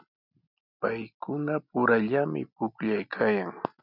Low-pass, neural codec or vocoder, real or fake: 5.4 kHz; none; real